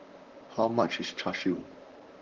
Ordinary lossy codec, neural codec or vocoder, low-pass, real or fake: Opus, 16 kbps; vocoder, 44.1 kHz, 128 mel bands, Pupu-Vocoder; 7.2 kHz; fake